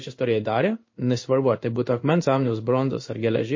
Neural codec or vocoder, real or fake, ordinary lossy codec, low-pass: codec, 16 kHz in and 24 kHz out, 1 kbps, XY-Tokenizer; fake; MP3, 32 kbps; 7.2 kHz